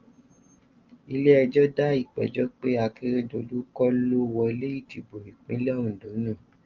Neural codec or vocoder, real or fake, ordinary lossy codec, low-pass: none; real; Opus, 24 kbps; 7.2 kHz